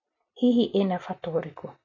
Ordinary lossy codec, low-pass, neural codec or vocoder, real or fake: none; 7.2 kHz; none; real